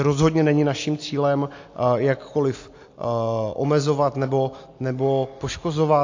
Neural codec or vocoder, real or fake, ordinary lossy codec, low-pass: none; real; AAC, 48 kbps; 7.2 kHz